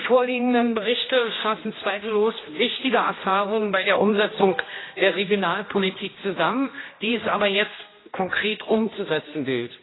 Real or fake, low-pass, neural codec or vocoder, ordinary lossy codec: fake; 7.2 kHz; codec, 16 kHz, 1 kbps, X-Codec, HuBERT features, trained on general audio; AAC, 16 kbps